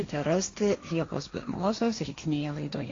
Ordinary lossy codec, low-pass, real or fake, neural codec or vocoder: AAC, 32 kbps; 7.2 kHz; fake; codec, 16 kHz, 1.1 kbps, Voila-Tokenizer